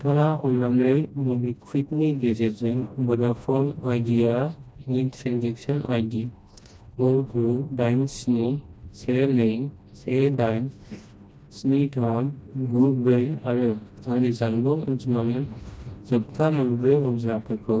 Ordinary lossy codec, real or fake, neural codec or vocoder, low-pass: none; fake; codec, 16 kHz, 1 kbps, FreqCodec, smaller model; none